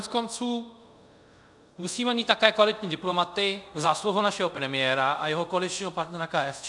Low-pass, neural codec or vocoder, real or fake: 10.8 kHz; codec, 24 kHz, 0.5 kbps, DualCodec; fake